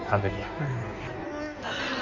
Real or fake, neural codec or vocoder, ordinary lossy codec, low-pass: fake; codec, 16 kHz in and 24 kHz out, 1.1 kbps, FireRedTTS-2 codec; none; 7.2 kHz